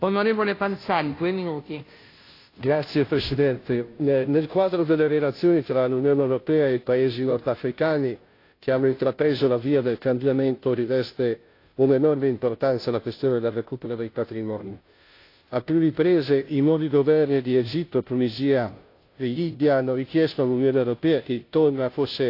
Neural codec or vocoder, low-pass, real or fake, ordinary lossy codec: codec, 16 kHz, 0.5 kbps, FunCodec, trained on Chinese and English, 25 frames a second; 5.4 kHz; fake; AAC, 32 kbps